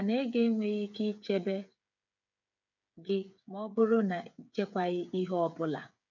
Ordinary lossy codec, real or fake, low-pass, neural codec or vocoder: none; fake; 7.2 kHz; codec, 16 kHz, 8 kbps, FreqCodec, smaller model